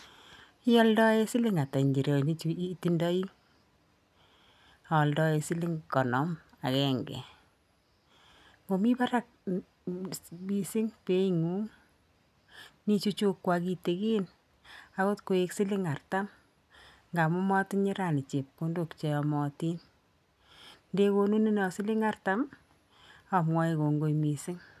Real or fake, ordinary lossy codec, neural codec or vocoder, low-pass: real; none; none; 14.4 kHz